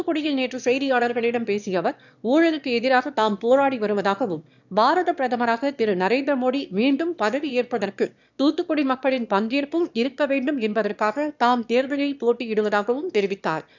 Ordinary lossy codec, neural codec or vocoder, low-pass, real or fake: none; autoencoder, 22.05 kHz, a latent of 192 numbers a frame, VITS, trained on one speaker; 7.2 kHz; fake